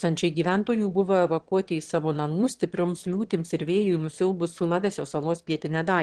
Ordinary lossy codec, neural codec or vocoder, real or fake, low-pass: Opus, 16 kbps; autoencoder, 22.05 kHz, a latent of 192 numbers a frame, VITS, trained on one speaker; fake; 9.9 kHz